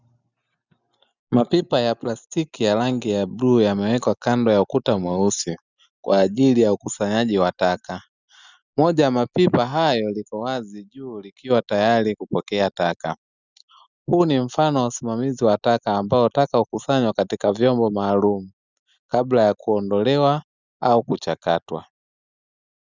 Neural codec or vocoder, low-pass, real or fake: none; 7.2 kHz; real